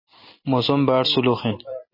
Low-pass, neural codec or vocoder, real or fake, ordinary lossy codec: 5.4 kHz; none; real; MP3, 24 kbps